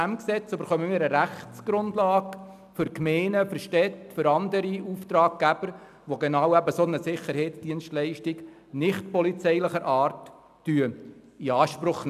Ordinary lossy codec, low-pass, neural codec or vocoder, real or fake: none; 14.4 kHz; none; real